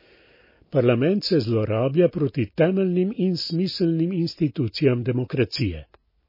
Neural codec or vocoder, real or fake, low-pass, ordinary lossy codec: none; real; 5.4 kHz; MP3, 24 kbps